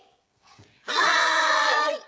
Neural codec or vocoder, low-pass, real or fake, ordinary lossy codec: codec, 16 kHz, 16 kbps, FreqCodec, smaller model; none; fake; none